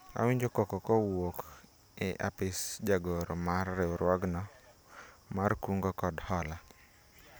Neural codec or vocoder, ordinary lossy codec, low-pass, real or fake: none; none; none; real